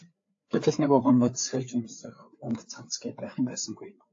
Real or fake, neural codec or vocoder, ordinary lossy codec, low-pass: fake; codec, 16 kHz, 4 kbps, FreqCodec, larger model; AAC, 48 kbps; 7.2 kHz